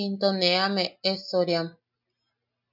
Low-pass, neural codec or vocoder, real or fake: 5.4 kHz; none; real